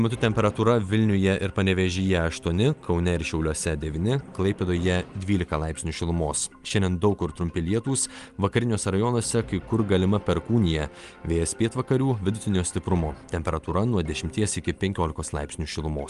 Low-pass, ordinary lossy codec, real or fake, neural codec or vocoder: 10.8 kHz; Opus, 32 kbps; real; none